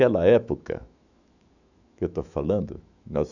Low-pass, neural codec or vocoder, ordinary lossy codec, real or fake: 7.2 kHz; autoencoder, 48 kHz, 128 numbers a frame, DAC-VAE, trained on Japanese speech; none; fake